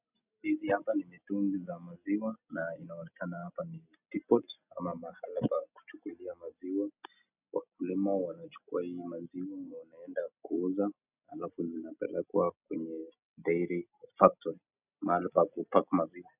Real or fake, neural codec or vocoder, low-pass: real; none; 3.6 kHz